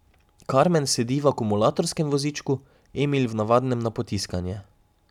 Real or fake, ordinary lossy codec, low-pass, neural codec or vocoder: real; none; 19.8 kHz; none